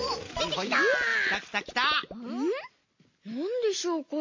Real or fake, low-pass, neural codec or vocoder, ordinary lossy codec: real; 7.2 kHz; none; MP3, 32 kbps